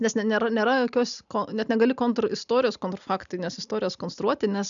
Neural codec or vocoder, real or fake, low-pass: none; real; 7.2 kHz